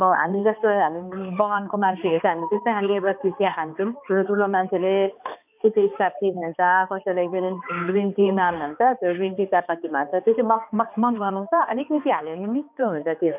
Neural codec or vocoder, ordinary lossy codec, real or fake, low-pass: codec, 16 kHz, 2 kbps, X-Codec, HuBERT features, trained on balanced general audio; none; fake; 3.6 kHz